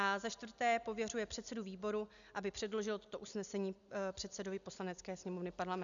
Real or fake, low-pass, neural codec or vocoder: real; 7.2 kHz; none